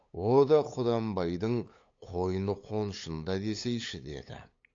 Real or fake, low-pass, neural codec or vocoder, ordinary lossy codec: fake; 7.2 kHz; codec, 16 kHz, 8 kbps, FunCodec, trained on LibriTTS, 25 frames a second; AAC, 48 kbps